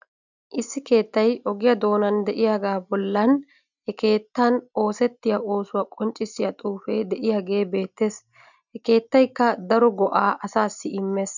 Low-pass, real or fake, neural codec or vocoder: 7.2 kHz; real; none